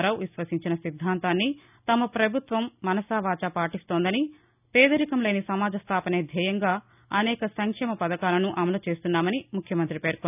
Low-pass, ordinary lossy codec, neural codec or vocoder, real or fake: 3.6 kHz; none; none; real